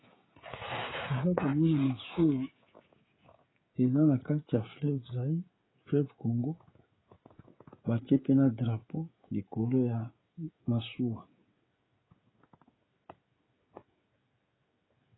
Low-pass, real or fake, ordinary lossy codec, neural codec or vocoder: 7.2 kHz; fake; AAC, 16 kbps; codec, 16 kHz, 16 kbps, FreqCodec, smaller model